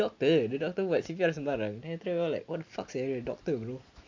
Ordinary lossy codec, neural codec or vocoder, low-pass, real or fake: MP3, 48 kbps; none; 7.2 kHz; real